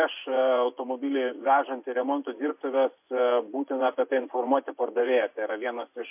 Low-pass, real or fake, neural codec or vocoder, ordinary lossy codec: 3.6 kHz; fake; vocoder, 24 kHz, 100 mel bands, Vocos; MP3, 32 kbps